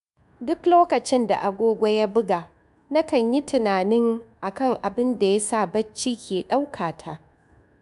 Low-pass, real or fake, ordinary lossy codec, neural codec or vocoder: 10.8 kHz; fake; MP3, 96 kbps; codec, 24 kHz, 1.2 kbps, DualCodec